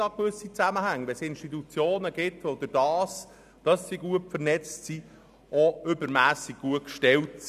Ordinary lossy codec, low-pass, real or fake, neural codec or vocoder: none; 14.4 kHz; real; none